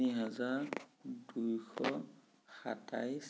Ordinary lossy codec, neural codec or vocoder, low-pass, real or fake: none; none; none; real